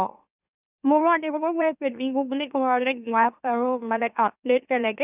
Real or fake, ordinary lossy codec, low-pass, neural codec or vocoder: fake; none; 3.6 kHz; autoencoder, 44.1 kHz, a latent of 192 numbers a frame, MeloTTS